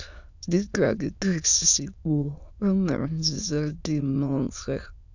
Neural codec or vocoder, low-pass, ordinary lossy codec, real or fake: autoencoder, 22.05 kHz, a latent of 192 numbers a frame, VITS, trained on many speakers; 7.2 kHz; none; fake